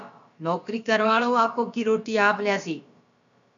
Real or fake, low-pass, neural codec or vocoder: fake; 7.2 kHz; codec, 16 kHz, about 1 kbps, DyCAST, with the encoder's durations